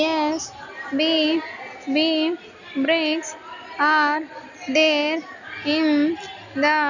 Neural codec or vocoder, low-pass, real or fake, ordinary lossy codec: none; 7.2 kHz; real; none